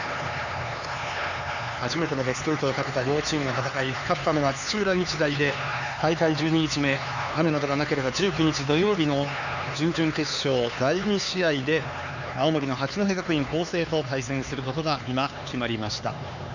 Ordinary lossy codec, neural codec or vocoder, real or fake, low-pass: none; codec, 16 kHz, 4 kbps, X-Codec, HuBERT features, trained on LibriSpeech; fake; 7.2 kHz